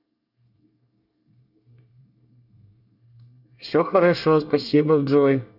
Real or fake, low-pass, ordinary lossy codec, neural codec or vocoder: fake; 5.4 kHz; none; codec, 24 kHz, 1 kbps, SNAC